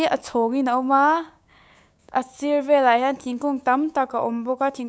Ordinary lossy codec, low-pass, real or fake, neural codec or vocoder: none; none; fake; codec, 16 kHz, 6 kbps, DAC